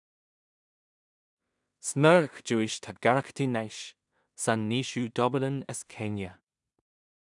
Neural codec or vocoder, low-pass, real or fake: codec, 16 kHz in and 24 kHz out, 0.4 kbps, LongCat-Audio-Codec, two codebook decoder; 10.8 kHz; fake